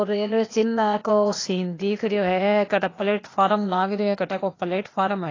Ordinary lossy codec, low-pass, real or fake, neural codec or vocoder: AAC, 32 kbps; 7.2 kHz; fake; codec, 16 kHz, 0.8 kbps, ZipCodec